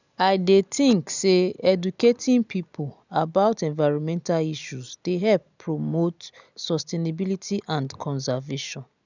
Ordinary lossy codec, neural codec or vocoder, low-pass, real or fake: none; none; 7.2 kHz; real